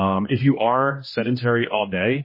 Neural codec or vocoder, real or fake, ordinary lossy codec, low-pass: codec, 16 kHz, 1 kbps, X-Codec, HuBERT features, trained on general audio; fake; MP3, 24 kbps; 5.4 kHz